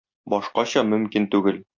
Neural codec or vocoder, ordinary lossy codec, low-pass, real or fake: none; MP3, 48 kbps; 7.2 kHz; real